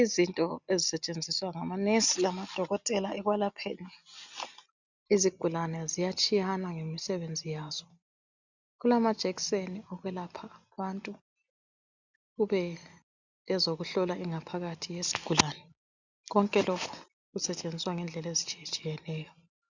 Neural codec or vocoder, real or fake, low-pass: none; real; 7.2 kHz